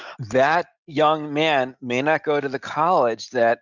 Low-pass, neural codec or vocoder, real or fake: 7.2 kHz; none; real